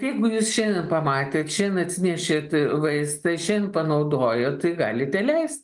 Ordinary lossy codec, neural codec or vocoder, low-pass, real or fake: Opus, 32 kbps; none; 10.8 kHz; real